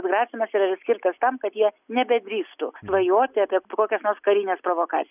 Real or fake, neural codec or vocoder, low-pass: real; none; 3.6 kHz